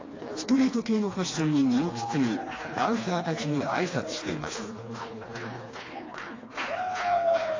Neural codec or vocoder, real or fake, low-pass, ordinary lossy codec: codec, 16 kHz, 2 kbps, FreqCodec, smaller model; fake; 7.2 kHz; AAC, 32 kbps